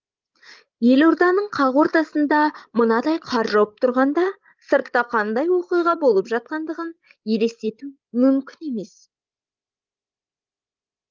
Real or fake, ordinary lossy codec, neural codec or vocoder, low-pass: fake; Opus, 24 kbps; codec, 16 kHz, 16 kbps, FreqCodec, larger model; 7.2 kHz